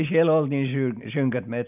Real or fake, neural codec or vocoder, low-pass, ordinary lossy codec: real; none; 3.6 kHz; none